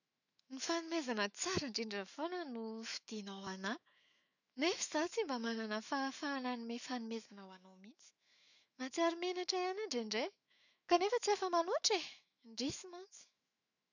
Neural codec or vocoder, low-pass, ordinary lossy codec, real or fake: autoencoder, 48 kHz, 128 numbers a frame, DAC-VAE, trained on Japanese speech; 7.2 kHz; none; fake